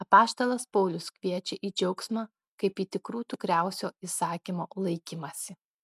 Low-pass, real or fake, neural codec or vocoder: 14.4 kHz; real; none